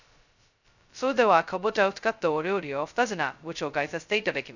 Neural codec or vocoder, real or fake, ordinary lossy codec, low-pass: codec, 16 kHz, 0.2 kbps, FocalCodec; fake; none; 7.2 kHz